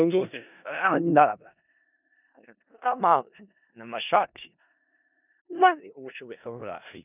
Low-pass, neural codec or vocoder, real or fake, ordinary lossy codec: 3.6 kHz; codec, 16 kHz in and 24 kHz out, 0.4 kbps, LongCat-Audio-Codec, four codebook decoder; fake; none